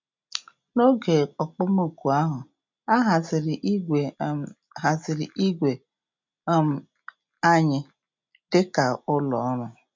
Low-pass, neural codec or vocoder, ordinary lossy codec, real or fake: 7.2 kHz; none; MP3, 64 kbps; real